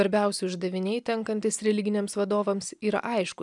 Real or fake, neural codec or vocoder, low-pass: real; none; 10.8 kHz